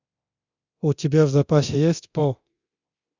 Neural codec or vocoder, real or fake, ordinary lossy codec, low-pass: codec, 24 kHz, 0.9 kbps, DualCodec; fake; Opus, 64 kbps; 7.2 kHz